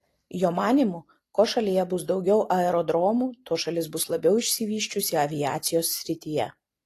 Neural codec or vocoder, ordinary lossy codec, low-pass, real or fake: none; AAC, 48 kbps; 14.4 kHz; real